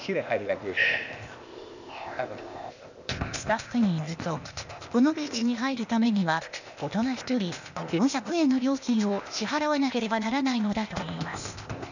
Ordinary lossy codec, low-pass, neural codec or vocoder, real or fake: none; 7.2 kHz; codec, 16 kHz, 0.8 kbps, ZipCodec; fake